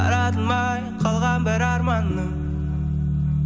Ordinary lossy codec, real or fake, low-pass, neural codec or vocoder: none; real; none; none